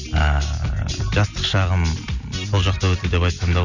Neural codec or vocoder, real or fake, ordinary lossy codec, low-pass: none; real; none; 7.2 kHz